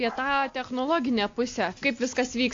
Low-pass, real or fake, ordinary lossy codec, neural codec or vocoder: 7.2 kHz; real; AAC, 48 kbps; none